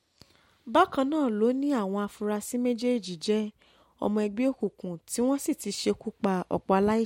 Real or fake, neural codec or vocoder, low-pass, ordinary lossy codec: real; none; 19.8 kHz; MP3, 64 kbps